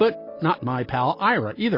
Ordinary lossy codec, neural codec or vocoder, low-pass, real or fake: MP3, 32 kbps; none; 5.4 kHz; real